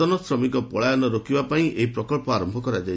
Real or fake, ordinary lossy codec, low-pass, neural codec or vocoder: real; none; none; none